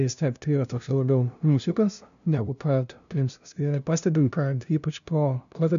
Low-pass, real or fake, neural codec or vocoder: 7.2 kHz; fake; codec, 16 kHz, 0.5 kbps, FunCodec, trained on LibriTTS, 25 frames a second